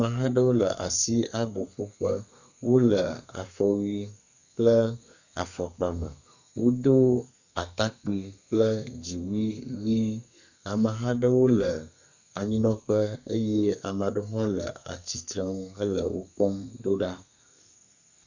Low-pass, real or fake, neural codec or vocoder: 7.2 kHz; fake; codec, 32 kHz, 1.9 kbps, SNAC